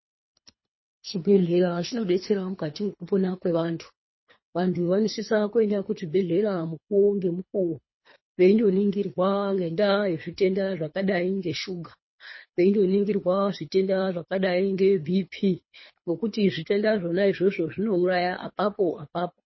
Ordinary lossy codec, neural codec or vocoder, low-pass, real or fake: MP3, 24 kbps; codec, 24 kHz, 3 kbps, HILCodec; 7.2 kHz; fake